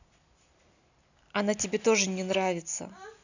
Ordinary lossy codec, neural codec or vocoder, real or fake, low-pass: none; none; real; 7.2 kHz